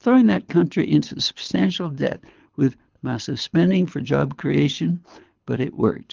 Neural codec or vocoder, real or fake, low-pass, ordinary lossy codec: codec, 24 kHz, 6 kbps, HILCodec; fake; 7.2 kHz; Opus, 32 kbps